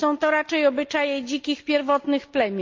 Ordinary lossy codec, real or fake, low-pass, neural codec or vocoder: Opus, 24 kbps; real; 7.2 kHz; none